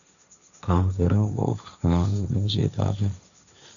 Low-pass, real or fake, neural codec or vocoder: 7.2 kHz; fake; codec, 16 kHz, 1.1 kbps, Voila-Tokenizer